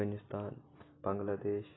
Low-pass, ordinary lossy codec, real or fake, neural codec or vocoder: 7.2 kHz; AAC, 16 kbps; real; none